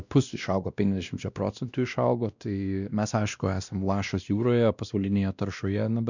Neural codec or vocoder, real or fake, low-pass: codec, 16 kHz, 1 kbps, X-Codec, WavLM features, trained on Multilingual LibriSpeech; fake; 7.2 kHz